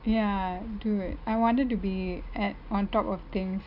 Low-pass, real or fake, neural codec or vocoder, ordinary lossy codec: 5.4 kHz; real; none; none